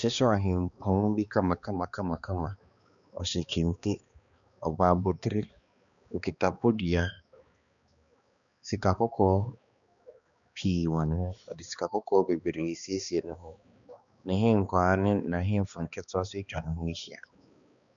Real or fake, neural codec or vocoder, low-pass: fake; codec, 16 kHz, 2 kbps, X-Codec, HuBERT features, trained on balanced general audio; 7.2 kHz